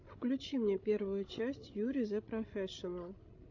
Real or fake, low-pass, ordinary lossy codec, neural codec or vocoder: fake; 7.2 kHz; AAC, 48 kbps; codec, 16 kHz, 16 kbps, FreqCodec, larger model